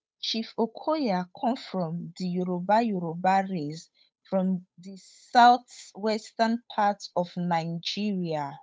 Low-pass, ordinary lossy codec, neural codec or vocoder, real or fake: none; none; codec, 16 kHz, 8 kbps, FunCodec, trained on Chinese and English, 25 frames a second; fake